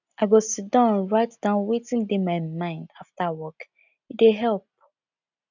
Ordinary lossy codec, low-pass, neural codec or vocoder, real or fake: none; 7.2 kHz; none; real